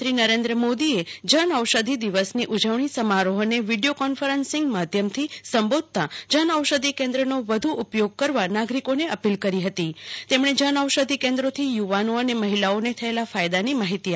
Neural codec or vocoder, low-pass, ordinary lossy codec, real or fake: none; none; none; real